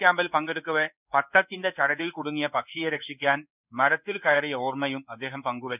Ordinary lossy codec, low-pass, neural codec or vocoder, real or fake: none; 3.6 kHz; codec, 16 kHz in and 24 kHz out, 1 kbps, XY-Tokenizer; fake